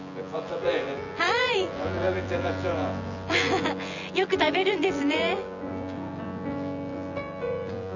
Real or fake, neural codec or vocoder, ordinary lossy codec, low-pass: fake; vocoder, 24 kHz, 100 mel bands, Vocos; none; 7.2 kHz